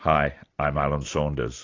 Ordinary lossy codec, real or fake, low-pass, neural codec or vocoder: AAC, 48 kbps; real; 7.2 kHz; none